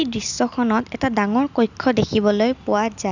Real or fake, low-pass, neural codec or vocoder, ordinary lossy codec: real; 7.2 kHz; none; none